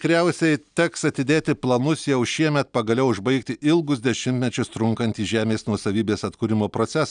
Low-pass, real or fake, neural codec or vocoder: 9.9 kHz; real; none